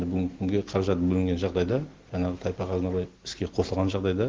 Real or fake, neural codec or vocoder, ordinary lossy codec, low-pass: real; none; Opus, 16 kbps; 7.2 kHz